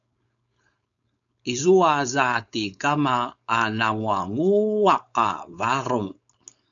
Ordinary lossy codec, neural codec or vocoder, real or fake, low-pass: MP3, 96 kbps; codec, 16 kHz, 4.8 kbps, FACodec; fake; 7.2 kHz